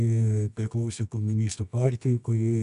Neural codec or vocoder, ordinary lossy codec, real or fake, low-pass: codec, 24 kHz, 0.9 kbps, WavTokenizer, medium music audio release; AAC, 96 kbps; fake; 10.8 kHz